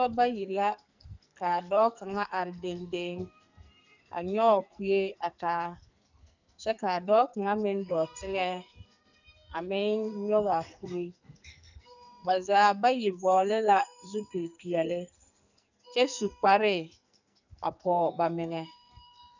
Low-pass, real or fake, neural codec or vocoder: 7.2 kHz; fake; codec, 44.1 kHz, 2.6 kbps, SNAC